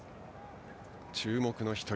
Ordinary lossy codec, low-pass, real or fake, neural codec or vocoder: none; none; real; none